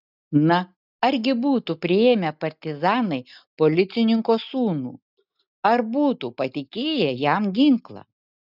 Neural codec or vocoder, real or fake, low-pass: none; real; 5.4 kHz